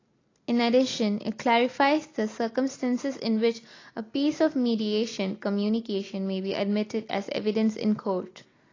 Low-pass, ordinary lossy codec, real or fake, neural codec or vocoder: 7.2 kHz; AAC, 32 kbps; real; none